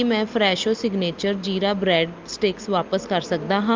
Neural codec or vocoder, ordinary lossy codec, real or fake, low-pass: none; Opus, 32 kbps; real; 7.2 kHz